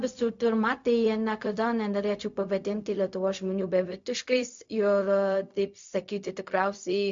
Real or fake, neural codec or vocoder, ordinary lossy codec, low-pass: fake; codec, 16 kHz, 0.4 kbps, LongCat-Audio-Codec; MP3, 48 kbps; 7.2 kHz